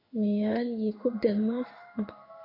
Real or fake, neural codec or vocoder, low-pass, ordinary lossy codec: fake; codec, 16 kHz in and 24 kHz out, 1 kbps, XY-Tokenizer; 5.4 kHz; AAC, 32 kbps